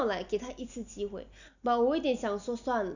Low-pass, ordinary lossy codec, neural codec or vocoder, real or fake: 7.2 kHz; none; none; real